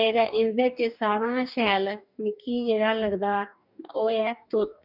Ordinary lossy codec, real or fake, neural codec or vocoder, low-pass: none; fake; codec, 44.1 kHz, 2.6 kbps, DAC; 5.4 kHz